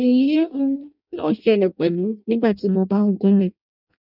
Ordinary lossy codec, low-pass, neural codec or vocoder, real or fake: none; 5.4 kHz; codec, 16 kHz in and 24 kHz out, 0.6 kbps, FireRedTTS-2 codec; fake